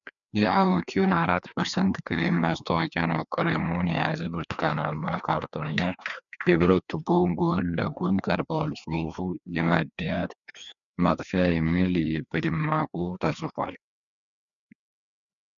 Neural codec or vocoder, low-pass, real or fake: codec, 16 kHz, 2 kbps, FreqCodec, larger model; 7.2 kHz; fake